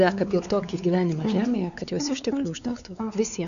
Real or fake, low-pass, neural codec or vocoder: fake; 7.2 kHz; codec, 16 kHz, 4 kbps, X-Codec, WavLM features, trained on Multilingual LibriSpeech